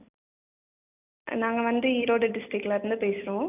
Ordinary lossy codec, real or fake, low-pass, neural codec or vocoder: none; real; 3.6 kHz; none